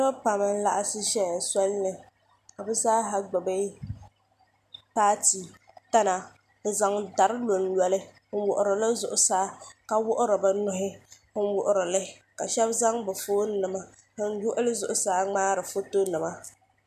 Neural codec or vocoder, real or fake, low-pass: none; real; 14.4 kHz